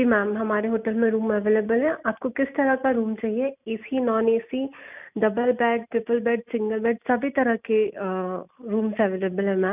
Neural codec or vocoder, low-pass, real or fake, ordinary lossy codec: none; 3.6 kHz; real; none